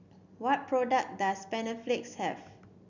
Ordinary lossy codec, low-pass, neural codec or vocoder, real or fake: none; 7.2 kHz; none; real